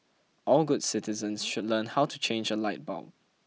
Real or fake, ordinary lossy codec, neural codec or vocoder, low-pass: real; none; none; none